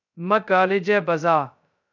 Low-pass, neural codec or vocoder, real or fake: 7.2 kHz; codec, 16 kHz, 0.2 kbps, FocalCodec; fake